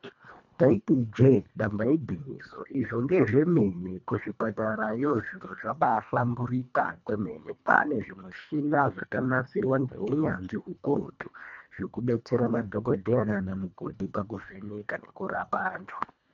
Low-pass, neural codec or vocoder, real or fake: 7.2 kHz; codec, 24 kHz, 1.5 kbps, HILCodec; fake